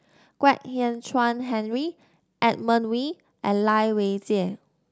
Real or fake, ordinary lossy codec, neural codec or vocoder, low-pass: real; none; none; none